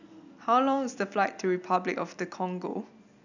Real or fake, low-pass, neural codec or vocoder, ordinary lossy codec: real; 7.2 kHz; none; none